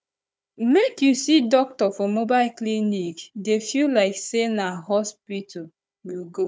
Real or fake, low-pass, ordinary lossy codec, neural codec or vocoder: fake; none; none; codec, 16 kHz, 4 kbps, FunCodec, trained on Chinese and English, 50 frames a second